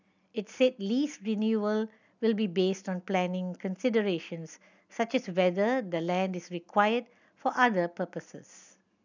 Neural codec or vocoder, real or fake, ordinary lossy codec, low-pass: none; real; none; 7.2 kHz